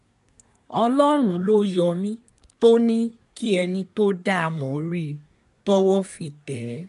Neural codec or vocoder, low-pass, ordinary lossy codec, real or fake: codec, 24 kHz, 1 kbps, SNAC; 10.8 kHz; none; fake